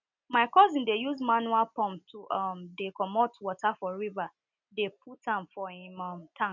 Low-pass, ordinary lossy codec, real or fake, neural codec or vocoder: 7.2 kHz; none; real; none